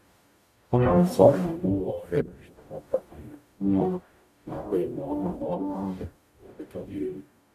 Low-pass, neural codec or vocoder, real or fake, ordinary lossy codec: 14.4 kHz; codec, 44.1 kHz, 0.9 kbps, DAC; fake; none